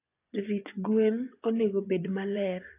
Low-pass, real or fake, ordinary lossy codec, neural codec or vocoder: 3.6 kHz; fake; AAC, 24 kbps; vocoder, 24 kHz, 100 mel bands, Vocos